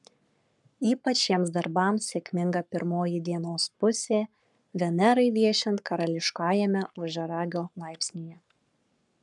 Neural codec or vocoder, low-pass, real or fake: codec, 44.1 kHz, 7.8 kbps, Pupu-Codec; 10.8 kHz; fake